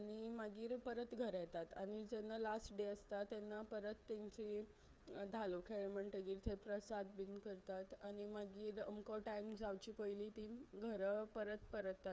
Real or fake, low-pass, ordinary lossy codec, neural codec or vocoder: fake; none; none; codec, 16 kHz, 8 kbps, FreqCodec, smaller model